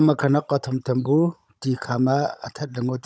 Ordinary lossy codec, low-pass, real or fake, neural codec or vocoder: none; none; fake; codec, 16 kHz, 16 kbps, FunCodec, trained on Chinese and English, 50 frames a second